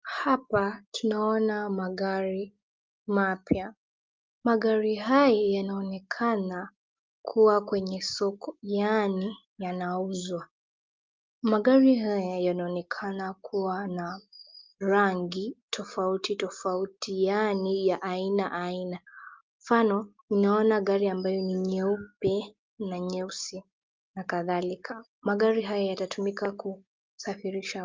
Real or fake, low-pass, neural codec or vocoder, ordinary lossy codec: real; 7.2 kHz; none; Opus, 24 kbps